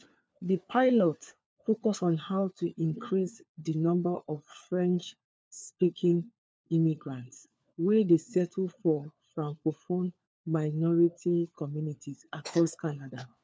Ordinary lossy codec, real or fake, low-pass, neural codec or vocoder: none; fake; none; codec, 16 kHz, 4 kbps, FunCodec, trained on LibriTTS, 50 frames a second